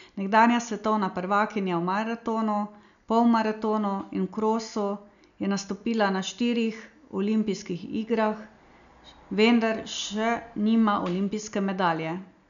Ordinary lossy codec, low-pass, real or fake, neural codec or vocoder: none; 7.2 kHz; real; none